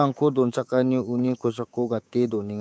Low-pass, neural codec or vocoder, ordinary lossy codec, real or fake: none; codec, 16 kHz, 6 kbps, DAC; none; fake